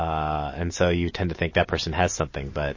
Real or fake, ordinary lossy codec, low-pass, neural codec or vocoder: real; MP3, 32 kbps; 7.2 kHz; none